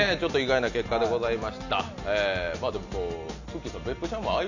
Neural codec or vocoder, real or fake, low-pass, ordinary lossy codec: none; real; 7.2 kHz; none